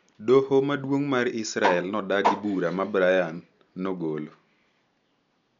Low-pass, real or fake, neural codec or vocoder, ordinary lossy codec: 7.2 kHz; real; none; none